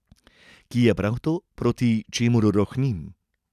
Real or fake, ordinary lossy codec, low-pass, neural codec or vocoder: real; none; 14.4 kHz; none